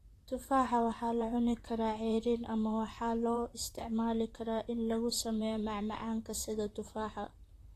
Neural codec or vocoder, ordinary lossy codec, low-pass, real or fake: vocoder, 44.1 kHz, 128 mel bands, Pupu-Vocoder; AAC, 48 kbps; 14.4 kHz; fake